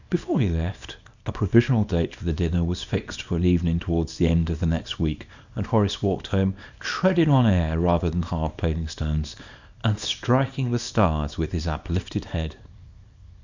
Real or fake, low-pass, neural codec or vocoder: fake; 7.2 kHz; codec, 24 kHz, 0.9 kbps, WavTokenizer, small release